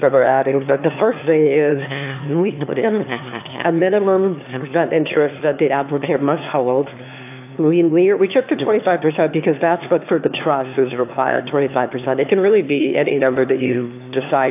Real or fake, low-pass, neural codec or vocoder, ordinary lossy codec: fake; 3.6 kHz; autoencoder, 22.05 kHz, a latent of 192 numbers a frame, VITS, trained on one speaker; AAC, 32 kbps